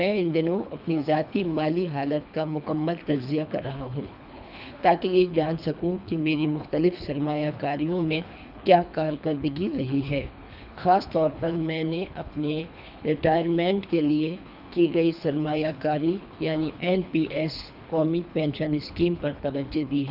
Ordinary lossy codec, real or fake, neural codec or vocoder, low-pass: none; fake; codec, 24 kHz, 3 kbps, HILCodec; 5.4 kHz